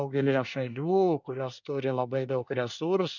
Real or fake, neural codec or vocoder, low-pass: fake; codec, 24 kHz, 1 kbps, SNAC; 7.2 kHz